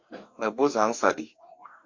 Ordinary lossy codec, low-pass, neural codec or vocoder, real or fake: MP3, 48 kbps; 7.2 kHz; codec, 44.1 kHz, 2.6 kbps, DAC; fake